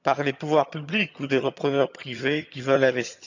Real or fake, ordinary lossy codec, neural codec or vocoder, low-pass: fake; none; vocoder, 22.05 kHz, 80 mel bands, HiFi-GAN; 7.2 kHz